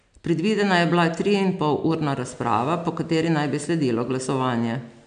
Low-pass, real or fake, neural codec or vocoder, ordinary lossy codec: 9.9 kHz; real; none; none